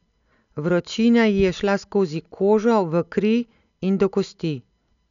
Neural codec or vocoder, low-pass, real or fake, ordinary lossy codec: none; 7.2 kHz; real; none